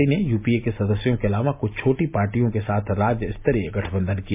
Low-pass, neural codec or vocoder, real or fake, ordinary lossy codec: 3.6 kHz; none; real; MP3, 32 kbps